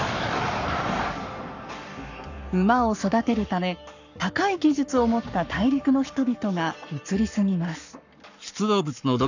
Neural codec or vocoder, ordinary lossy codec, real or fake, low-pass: codec, 44.1 kHz, 7.8 kbps, Pupu-Codec; none; fake; 7.2 kHz